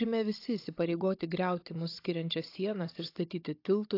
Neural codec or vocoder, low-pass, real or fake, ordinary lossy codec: codec, 16 kHz, 16 kbps, FunCodec, trained on Chinese and English, 50 frames a second; 5.4 kHz; fake; AAC, 32 kbps